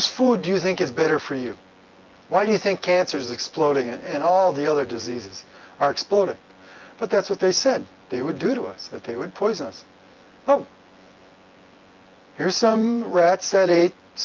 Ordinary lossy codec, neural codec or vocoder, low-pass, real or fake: Opus, 32 kbps; vocoder, 24 kHz, 100 mel bands, Vocos; 7.2 kHz; fake